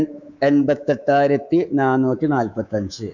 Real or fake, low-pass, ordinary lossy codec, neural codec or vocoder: fake; 7.2 kHz; none; codec, 24 kHz, 3.1 kbps, DualCodec